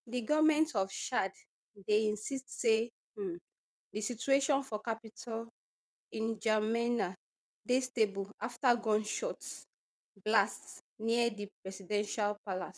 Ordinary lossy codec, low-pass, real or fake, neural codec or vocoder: none; none; fake; vocoder, 22.05 kHz, 80 mel bands, WaveNeXt